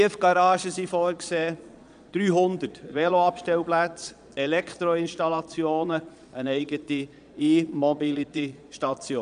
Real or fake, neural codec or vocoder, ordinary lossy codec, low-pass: fake; vocoder, 22.05 kHz, 80 mel bands, Vocos; none; 9.9 kHz